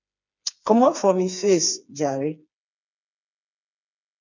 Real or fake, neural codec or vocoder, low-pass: fake; codec, 16 kHz, 4 kbps, FreqCodec, smaller model; 7.2 kHz